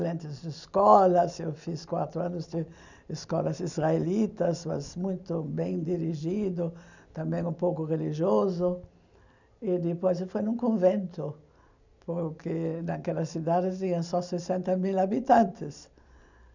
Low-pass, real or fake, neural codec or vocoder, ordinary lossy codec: 7.2 kHz; real; none; none